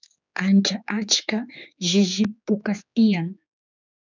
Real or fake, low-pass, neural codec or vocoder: fake; 7.2 kHz; codec, 16 kHz, 4 kbps, X-Codec, HuBERT features, trained on general audio